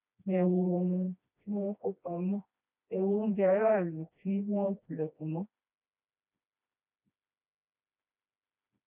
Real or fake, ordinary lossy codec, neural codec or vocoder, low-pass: fake; none; codec, 16 kHz, 1 kbps, FreqCodec, smaller model; 3.6 kHz